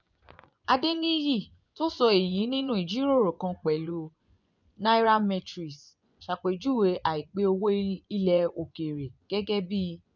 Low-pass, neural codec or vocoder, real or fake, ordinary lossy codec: 7.2 kHz; vocoder, 24 kHz, 100 mel bands, Vocos; fake; none